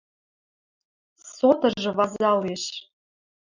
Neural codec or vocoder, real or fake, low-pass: none; real; 7.2 kHz